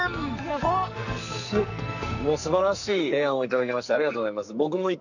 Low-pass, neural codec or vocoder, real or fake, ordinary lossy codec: 7.2 kHz; codec, 44.1 kHz, 2.6 kbps, SNAC; fake; none